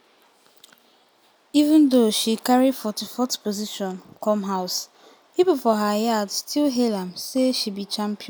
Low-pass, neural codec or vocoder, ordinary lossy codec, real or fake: none; none; none; real